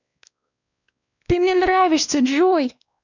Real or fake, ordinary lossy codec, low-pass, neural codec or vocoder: fake; none; 7.2 kHz; codec, 16 kHz, 1 kbps, X-Codec, WavLM features, trained on Multilingual LibriSpeech